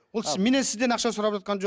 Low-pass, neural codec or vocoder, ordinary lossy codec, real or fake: none; none; none; real